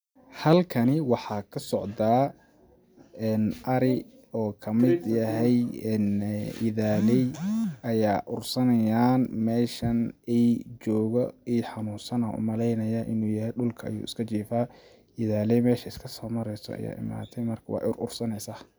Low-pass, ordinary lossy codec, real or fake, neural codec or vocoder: none; none; real; none